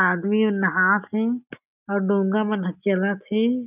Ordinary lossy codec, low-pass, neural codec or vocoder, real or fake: none; 3.6 kHz; none; real